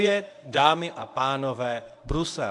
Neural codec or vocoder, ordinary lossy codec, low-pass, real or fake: codec, 24 kHz, 0.9 kbps, WavTokenizer, medium speech release version 1; AAC, 64 kbps; 10.8 kHz; fake